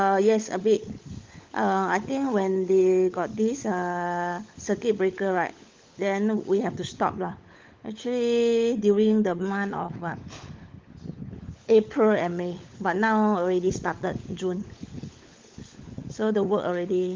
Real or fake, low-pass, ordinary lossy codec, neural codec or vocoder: fake; 7.2 kHz; Opus, 32 kbps; codec, 16 kHz, 16 kbps, FunCodec, trained on LibriTTS, 50 frames a second